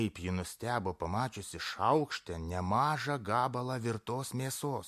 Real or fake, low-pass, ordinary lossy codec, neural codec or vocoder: real; 14.4 kHz; MP3, 64 kbps; none